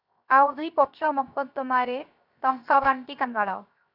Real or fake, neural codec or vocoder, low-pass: fake; codec, 16 kHz, 0.7 kbps, FocalCodec; 5.4 kHz